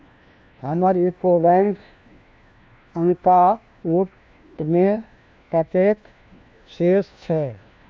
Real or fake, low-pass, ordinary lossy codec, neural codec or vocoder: fake; none; none; codec, 16 kHz, 1 kbps, FunCodec, trained on LibriTTS, 50 frames a second